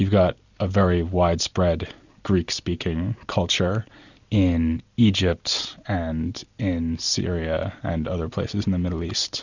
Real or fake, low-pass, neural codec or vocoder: real; 7.2 kHz; none